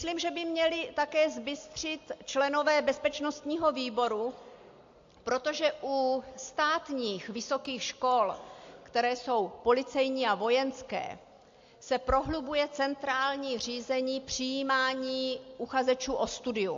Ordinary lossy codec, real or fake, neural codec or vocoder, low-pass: AAC, 48 kbps; real; none; 7.2 kHz